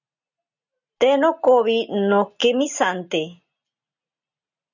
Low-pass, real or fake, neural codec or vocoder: 7.2 kHz; real; none